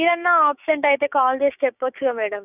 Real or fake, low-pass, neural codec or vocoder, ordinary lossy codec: fake; 3.6 kHz; codec, 16 kHz, 6 kbps, DAC; none